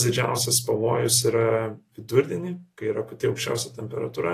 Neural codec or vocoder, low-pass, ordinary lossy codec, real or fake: none; 14.4 kHz; AAC, 48 kbps; real